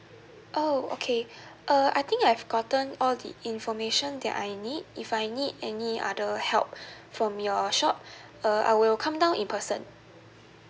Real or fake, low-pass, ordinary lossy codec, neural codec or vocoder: real; none; none; none